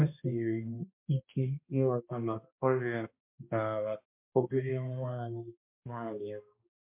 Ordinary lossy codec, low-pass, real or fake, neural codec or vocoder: MP3, 32 kbps; 3.6 kHz; fake; codec, 16 kHz, 1 kbps, X-Codec, HuBERT features, trained on balanced general audio